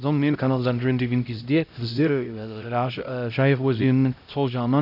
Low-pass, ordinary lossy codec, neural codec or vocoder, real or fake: 5.4 kHz; AAC, 48 kbps; codec, 16 kHz, 0.5 kbps, X-Codec, HuBERT features, trained on LibriSpeech; fake